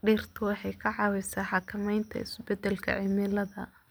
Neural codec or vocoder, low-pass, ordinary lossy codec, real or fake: none; none; none; real